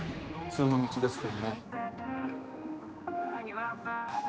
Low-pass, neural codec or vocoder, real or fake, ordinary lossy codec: none; codec, 16 kHz, 2 kbps, X-Codec, HuBERT features, trained on general audio; fake; none